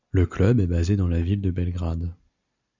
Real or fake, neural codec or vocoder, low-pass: real; none; 7.2 kHz